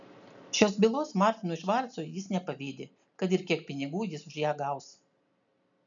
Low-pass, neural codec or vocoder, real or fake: 7.2 kHz; none; real